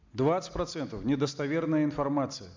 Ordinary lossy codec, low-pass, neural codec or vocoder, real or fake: MP3, 64 kbps; 7.2 kHz; none; real